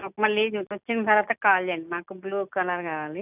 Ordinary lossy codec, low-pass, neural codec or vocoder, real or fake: none; 3.6 kHz; none; real